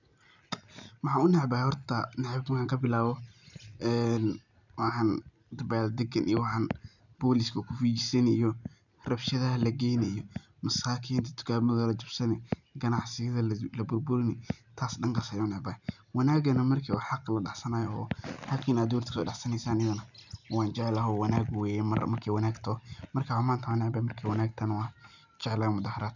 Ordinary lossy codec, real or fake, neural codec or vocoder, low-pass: none; real; none; 7.2 kHz